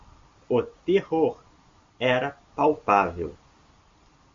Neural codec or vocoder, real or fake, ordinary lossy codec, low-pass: none; real; MP3, 48 kbps; 7.2 kHz